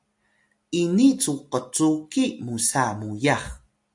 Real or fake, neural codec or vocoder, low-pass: real; none; 10.8 kHz